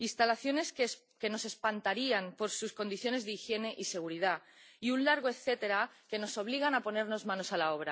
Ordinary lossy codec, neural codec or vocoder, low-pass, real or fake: none; none; none; real